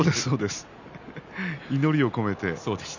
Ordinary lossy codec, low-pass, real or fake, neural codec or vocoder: none; 7.2 kHz; real; none